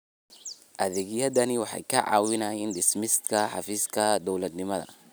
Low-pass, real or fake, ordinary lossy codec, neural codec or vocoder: none; real; none; none